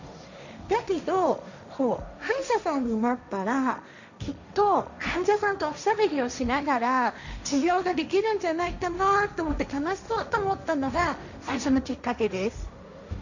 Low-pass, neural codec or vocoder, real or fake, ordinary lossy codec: 7.2 kHz; codec, 16 kHz, 1.1 kbps, Voila-Tokenizer; fake; none